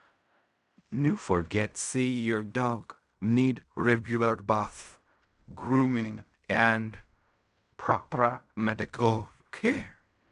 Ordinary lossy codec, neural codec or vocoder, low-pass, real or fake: AAC, 96 kbps; codec, 16 kHz in and 24 kHz out, 0.4 kbps, LongCat-Audio-Codec, fine tuned four codebook decoder; 10.8 kHz; fake